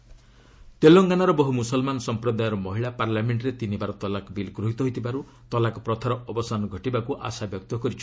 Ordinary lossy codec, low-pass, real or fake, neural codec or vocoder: none; none; real; none